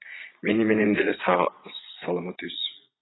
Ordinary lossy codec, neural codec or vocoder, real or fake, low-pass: AAC, 16 kbps; vocoder, 22.05 kHz, 80 mel bands, Vocos; fake; 7.2 kHz